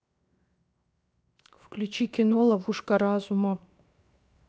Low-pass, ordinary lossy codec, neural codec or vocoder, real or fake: none; none; codec, 16 kHz, 0.7 kbps, FocalCodec; fake